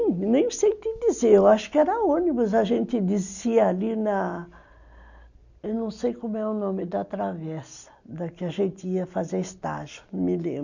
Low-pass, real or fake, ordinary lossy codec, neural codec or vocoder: 7.2 kHz; real; none; none